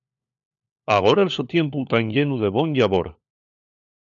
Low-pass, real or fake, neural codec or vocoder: 7.2 kHz; fake; codec, 16 kHz, 4 kbps, FunCodec, trained on LibriTTS, 50 frames a second